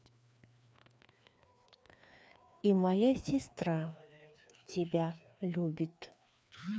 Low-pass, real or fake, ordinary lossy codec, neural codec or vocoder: none; fake; none; codec, 16 kHz, 2 kbps, FreqCodec, larger model